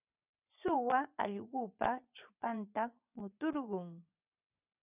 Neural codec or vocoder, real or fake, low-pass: vocoder, 24 kHz, 100 mel bands, Vocos; fake; 3.6 kHz